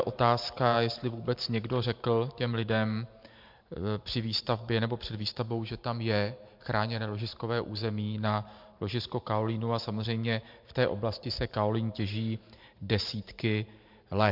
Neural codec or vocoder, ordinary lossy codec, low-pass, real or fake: vocoder, 44.1 kHz, 128 mel bands every 512 samples, BigVGAN v2; MP3, 48 kbps; 5.4 kHz; fake